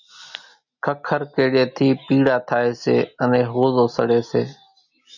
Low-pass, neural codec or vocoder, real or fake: 7.2 kHz; none; real